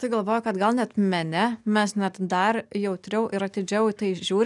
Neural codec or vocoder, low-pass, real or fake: none; 10.8 kHz; real